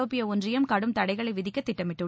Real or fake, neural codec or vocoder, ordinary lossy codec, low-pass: real; none; none; none